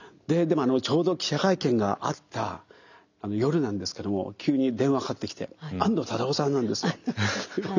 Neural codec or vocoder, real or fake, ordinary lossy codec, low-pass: none; real; none; 7.2 kHz